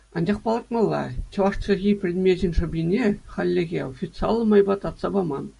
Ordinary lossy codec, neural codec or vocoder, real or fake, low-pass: AAC, 96 kbps; none; real; 10.8 kHz